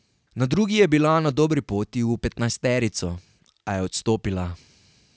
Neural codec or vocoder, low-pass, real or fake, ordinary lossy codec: none; none; real; none